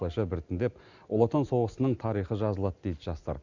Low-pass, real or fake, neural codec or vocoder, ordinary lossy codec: 7.2 kHz; real; none; Opus, 64 kbps